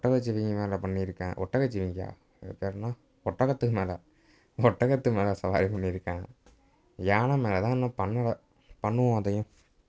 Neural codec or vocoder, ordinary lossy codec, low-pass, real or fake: none; none; none; real